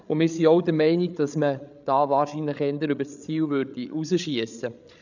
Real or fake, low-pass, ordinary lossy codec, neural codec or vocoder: fake; 7.2 kHz; none; codec, 16 kHz, 8 kbps, FreqCodec, larger model